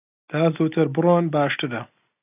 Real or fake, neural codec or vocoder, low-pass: real; none; 3.6 kHz